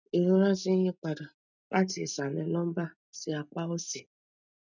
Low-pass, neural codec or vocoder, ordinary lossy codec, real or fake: 7.2 kHz; none; none; real